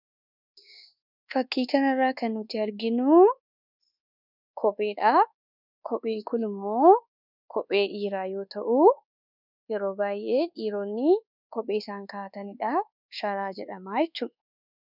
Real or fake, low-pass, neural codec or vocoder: fake; 5.4 kHz; codec, 24 kHz, 1.2 kbps, DualCodec